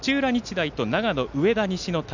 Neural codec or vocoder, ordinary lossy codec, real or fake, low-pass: none; none; real; 7.2 kHz